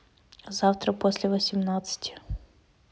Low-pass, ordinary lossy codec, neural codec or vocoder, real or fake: none; none; none; real